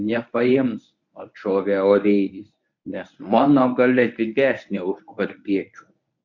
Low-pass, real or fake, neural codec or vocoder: 7.2 kHz; fake; codec, 24 kHz, 0.9 kbps, WavTokenizer, medium speech release version 1